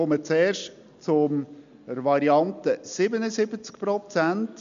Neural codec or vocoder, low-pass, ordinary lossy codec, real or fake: none; 7.2 kHz; MP3, 64 kbps; real